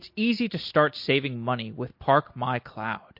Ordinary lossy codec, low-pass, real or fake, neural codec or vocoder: MP3, 32 kbps; 5.4 kHz; real; none